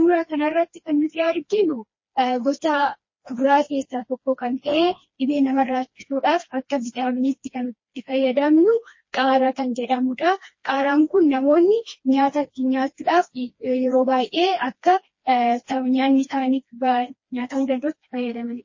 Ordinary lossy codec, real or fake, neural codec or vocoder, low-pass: MP3, 32 kbps; fake; codec, 16 kHz, 2 kbps, FreqCodec, smaller model; 7.2 kHz